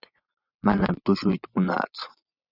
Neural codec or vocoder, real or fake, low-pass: vocoder, 24 kHz, 100 mel bands, Vocos; fake; 5.4 kHz